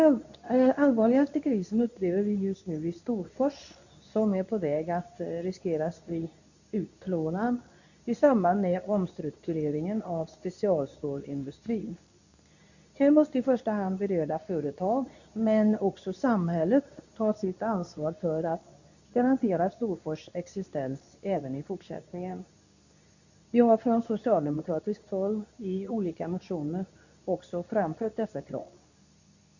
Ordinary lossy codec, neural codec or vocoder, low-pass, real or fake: none; codec, 24 kHz, 0.9 kbps, WavTokenizer, medium speech release version 2; 7.2 kHz; fake